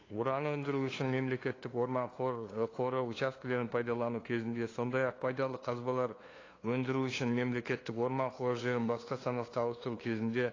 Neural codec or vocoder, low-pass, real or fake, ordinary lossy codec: codec, 16 kHz, 2 kbps, FunCodec, trained on LibriTTS, 25 frames a second; 7.2 kHz; fake; AAC, 32 kbps